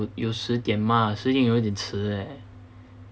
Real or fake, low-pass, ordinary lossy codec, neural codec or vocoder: real; none; none; none